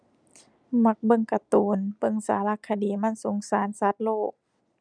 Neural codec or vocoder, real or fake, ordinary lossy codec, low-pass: none; real; none; 9.9 kHz